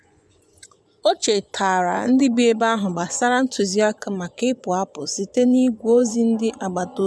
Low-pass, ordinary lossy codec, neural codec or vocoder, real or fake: none; none; vocoder, 24 kHz, 100 mel bands, Vocos; fake